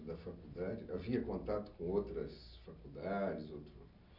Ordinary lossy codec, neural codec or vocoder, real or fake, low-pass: none; none; real; 5.4 kHz